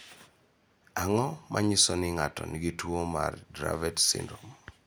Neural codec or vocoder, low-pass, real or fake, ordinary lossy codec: none; none; real; none